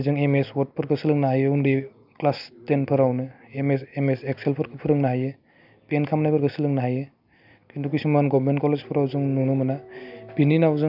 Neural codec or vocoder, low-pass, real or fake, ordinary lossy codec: none; 5.4 kHz; real; none